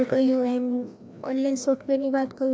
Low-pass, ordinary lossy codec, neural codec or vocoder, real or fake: none; none; codec, 16 kHz, 1 kbps, FreqCodec, larger model; fake